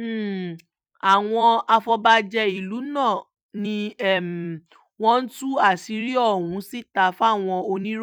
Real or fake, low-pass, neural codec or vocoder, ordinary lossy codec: fake; 19.8 kHz; vocoder, 44.1 kHz, 128 mel bands every 256 samples, BigVGAN v2; none